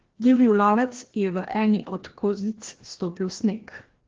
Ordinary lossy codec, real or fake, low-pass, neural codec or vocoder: Opus, 16 kbps; fake; 7.2 kHz; codec, 16 kHz, 1 kbps, FreqCodec, larger model